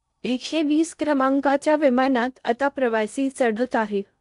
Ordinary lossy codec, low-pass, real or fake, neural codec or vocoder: none; 10.8 kHz; fake; codec, 16 kHz in and 24 kHz out, 0.6 kbps, FocalCodec, streaming, 2048 codes